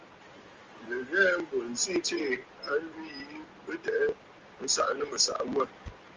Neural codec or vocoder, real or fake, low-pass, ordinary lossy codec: none; real; 7.2 kHz; Opus, 32 kbps